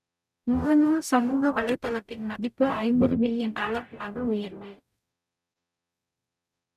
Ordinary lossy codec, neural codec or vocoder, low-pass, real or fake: none; codec, 44.1 kHz, 0.9 kbps, DAC; 14.4 kHz; fake